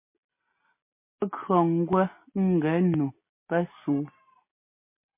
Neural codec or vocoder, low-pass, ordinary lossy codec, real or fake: none; 3.6 kHz; MP3, 24 kbps; real